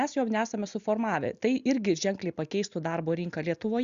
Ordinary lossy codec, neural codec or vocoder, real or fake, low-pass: Opus, 64 kbps; none; real; 7.2 kHz